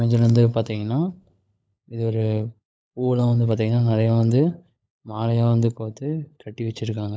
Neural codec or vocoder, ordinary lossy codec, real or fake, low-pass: codec, 16 kHz, 16 kbps, FunCodec, trained on LibriTTS, 50 frames a second; none; fake; none